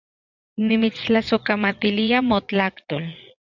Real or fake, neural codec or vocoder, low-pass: fake; vocoder, 22.05 kHz, 80 mel bands, Vocos; 7.2 kHz